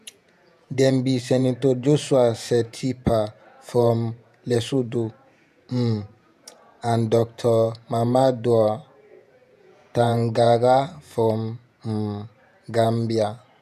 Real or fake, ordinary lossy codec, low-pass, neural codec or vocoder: fake; none; 14.4 kHz; vocoder, 48 kHz, 128 mel bands, Vocos